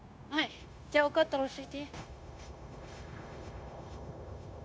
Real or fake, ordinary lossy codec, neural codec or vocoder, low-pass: fake; none; codec, 16 kHz, 0.9 kbps, LongCat-Audio-Codec; none